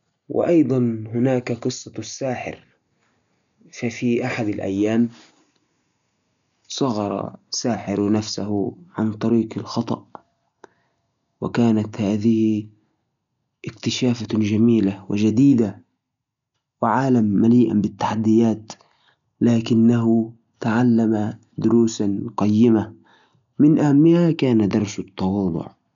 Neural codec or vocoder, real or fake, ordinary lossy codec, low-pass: none; real; none; 7.2 kHz